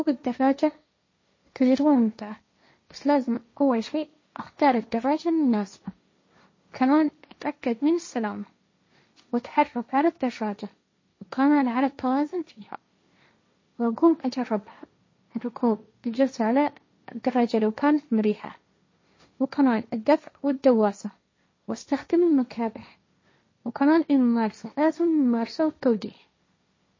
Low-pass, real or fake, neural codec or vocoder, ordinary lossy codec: 7.2 kHz; fake; codec, 16 kHz, 1.1 kbps, Voila-Tokenizer; MP3, 32 kbps